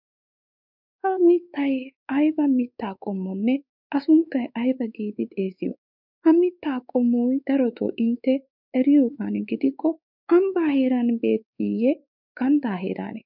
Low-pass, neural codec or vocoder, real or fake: 5.4 kHz; codec, 16 kHz, 4 kbps, X-Codec, WavLM features, trained on Multilingual LibriSpeech; fake